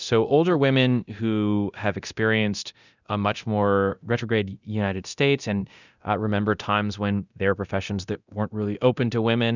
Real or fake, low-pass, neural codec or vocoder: fake; 7.2 kHz; codec, 24 kHz, 0.9 kbps, DualCodec